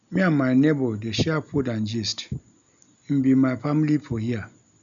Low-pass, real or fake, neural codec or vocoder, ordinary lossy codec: 7.2 kHz; real; none; MP3, 96 kbps